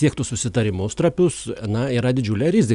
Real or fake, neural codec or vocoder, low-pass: real; none; 10.8 kHz